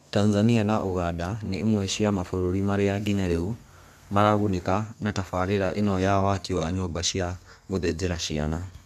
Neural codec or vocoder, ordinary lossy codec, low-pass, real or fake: codec, 32 kHz, 1.9 kbps, SNAC; none; 14.4 kHz; fake